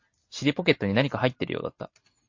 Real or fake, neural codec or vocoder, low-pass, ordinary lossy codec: real; none; 7.2 kHz; MP3, 48 kbps